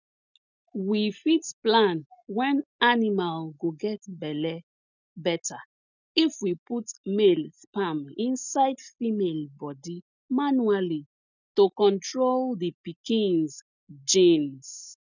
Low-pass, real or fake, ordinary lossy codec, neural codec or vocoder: 7.2 kHz; real; none; none